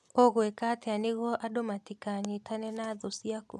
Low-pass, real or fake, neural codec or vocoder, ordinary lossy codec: none; real; none; none